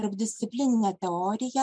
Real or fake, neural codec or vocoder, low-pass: real; none; 9.9 kHz